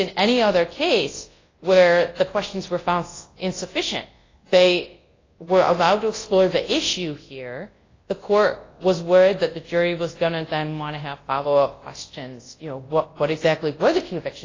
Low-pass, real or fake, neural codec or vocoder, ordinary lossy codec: 7.2 kHz; fake; codec, 24 kHz, 0.9 kbps, WavTokenizer, large speech release; AAC, 32 kbps